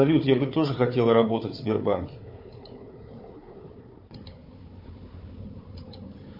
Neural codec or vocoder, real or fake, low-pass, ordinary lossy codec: codec, 16 kHz, 4 kbps, FunCodec, trained on Chinese and English, 50 frames a second; fake; 5.4 kHz; MP3, 32 kbps